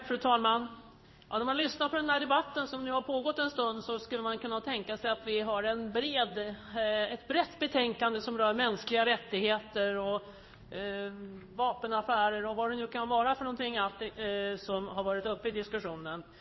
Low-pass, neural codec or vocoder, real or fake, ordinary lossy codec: 7.2 kHz; codec, 16 kHz in and 24 kHz out, 1 kbps, XY-Tokenizer; fake; MP3, 24 kbps